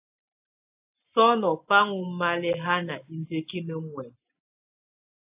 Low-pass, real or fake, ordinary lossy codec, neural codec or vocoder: 3.6 kHz; real; AAC, 32 kbps; none